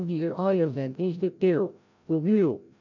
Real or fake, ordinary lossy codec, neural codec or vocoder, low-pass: fake; none; codec, 16 kHz, 0.5 kbps, FreqCodec, larger model; 7.2 kHz